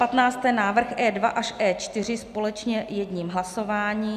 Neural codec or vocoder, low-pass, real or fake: none; 14.4 kHz; real